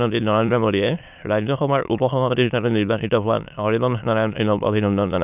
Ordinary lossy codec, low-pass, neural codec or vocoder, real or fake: none; 3.6 kHz; autoencoder, 22.05 kHz, a latent of 192 numbers a frame, VITS, trained on many speakers; fake